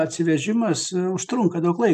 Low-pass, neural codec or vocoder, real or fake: 14.4 kHz; none; real